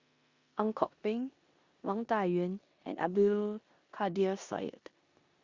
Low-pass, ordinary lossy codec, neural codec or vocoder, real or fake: 7.2 kHz; Opus, 64 kbps; codec, 16 kHz in and 24 kHz out, 0.9 kbps, LongCat-Audio-Codec, four codebook decoder; fake